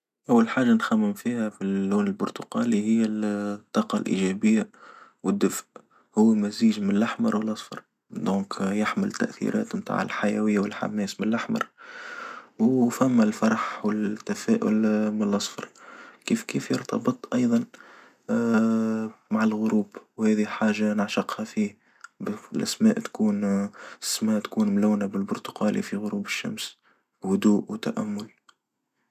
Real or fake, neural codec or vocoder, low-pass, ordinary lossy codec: real; none; 14.4 kHz; none